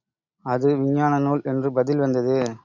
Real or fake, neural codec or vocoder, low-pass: real; none; 7.2 kHz